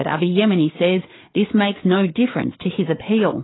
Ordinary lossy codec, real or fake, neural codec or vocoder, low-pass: AAC, 16 kbps; fake; codec, 16 kHz, 4 kbps, FreqCodec, larger model; 7.2 kHz